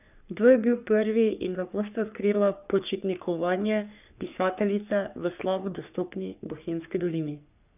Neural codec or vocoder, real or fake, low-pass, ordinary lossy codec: codec, 44.1 kHz, 3.4 kbps, Pupu-Codec; fake; 3.6 kHz; none